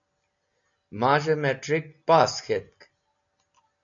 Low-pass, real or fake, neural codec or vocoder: 7.2 kHz; real; none